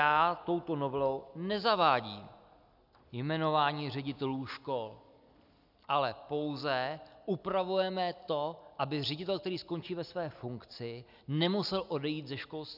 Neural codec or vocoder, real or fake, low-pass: none; real; 5.4 kHz